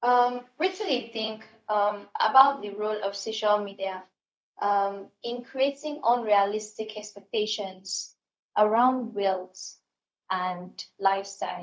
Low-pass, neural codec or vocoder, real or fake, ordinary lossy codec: none; codec, 16 kHz, 0.4 kbps, LongCat-Audio-Codec; fake; none